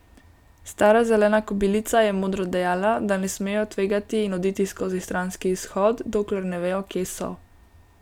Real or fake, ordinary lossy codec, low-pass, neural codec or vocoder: real; none; 19.8 kHz; none